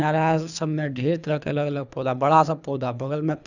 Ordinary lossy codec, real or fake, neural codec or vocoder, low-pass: none; fake; codec, 24 kHz, 3 kbps, HILCodec; 7.2 kHz